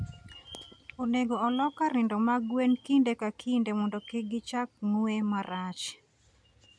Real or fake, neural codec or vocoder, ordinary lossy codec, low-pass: real; none; MP3, 96 kbps; 9.9 kHz